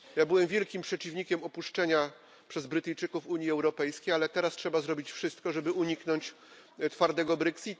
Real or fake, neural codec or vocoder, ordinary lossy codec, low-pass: real; none; none; none